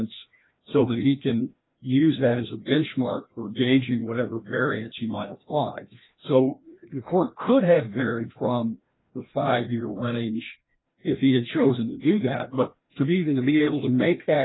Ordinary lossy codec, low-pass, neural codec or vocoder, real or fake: AAC, 16 kbps; 7.2 kHz; codec, 16 kHz, 1 kbps, FreqCodec, larger model; fake